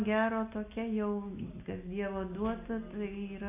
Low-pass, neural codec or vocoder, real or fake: 3.6 kHz; none; real